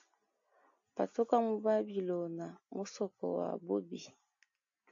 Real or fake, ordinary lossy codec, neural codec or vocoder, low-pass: real; AAC, 64 kbps; none; 7.2 kHz